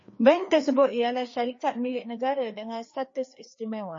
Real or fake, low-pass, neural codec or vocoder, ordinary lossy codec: fake; 7.2 kHz; codec, 16 kHz, 2 kbps, FreqCodec, larger model; MP3, 32 kbps